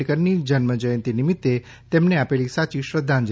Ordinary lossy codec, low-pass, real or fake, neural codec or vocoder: none; none; real; none